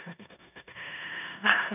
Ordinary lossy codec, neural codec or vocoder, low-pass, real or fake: none; codec, 16 kHz in and 24 kHz out, 0.9 kbps, LongCat-Audio-Codec, four codebook decoder; 3.6 kHz; fake